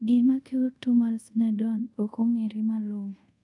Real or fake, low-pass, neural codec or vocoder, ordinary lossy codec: fake; 10.8 kHz; codec, 24 kHz, 0.5 kbps, DualCodec; none